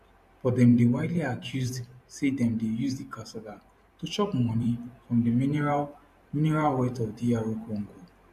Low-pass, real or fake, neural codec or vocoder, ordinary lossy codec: 14.4 kHz; fake; vocoder, 44.1 kHz, 128 mel bands every 512 samples, BigVGAN v2; MP3, 64 kbps